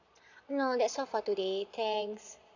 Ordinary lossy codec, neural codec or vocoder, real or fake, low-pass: none; vocoder, 22.05 kHz, 80 mel bands, Vocos; fake; 7.2 kHz